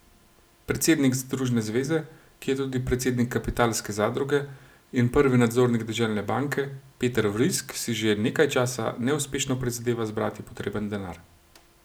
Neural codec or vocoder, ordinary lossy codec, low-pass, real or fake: none; none; none; real